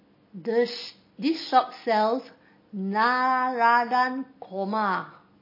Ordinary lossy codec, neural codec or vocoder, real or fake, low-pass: MP3, 24 kbps; none; real; 5.4 kHz